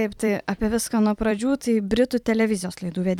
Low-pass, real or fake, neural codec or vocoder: 19.8 kHz; fake; vocoder, 44.1 kHz, 128 mel bands every 256 samples, BigVGAN v2